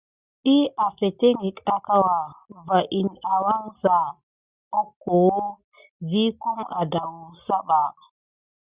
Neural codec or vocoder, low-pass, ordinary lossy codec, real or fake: none; 3.6 kHz; Opus, 64 kbps; real